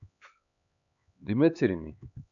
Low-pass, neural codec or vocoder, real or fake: 7.2 kHz; codec, 16 kHz, 4 kbps, X-Codec, HuBERT features, trained on LibriSpeech; fake